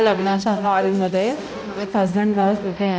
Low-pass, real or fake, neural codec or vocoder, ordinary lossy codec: none; fake; codec, 16 kHz, 0.5 kbps, X-Codec, HuBERT features, trained on balanced general audio; none